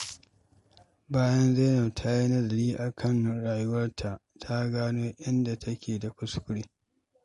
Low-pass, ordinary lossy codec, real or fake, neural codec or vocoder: 14.4 kHz; MP3, 48 kbps; real; none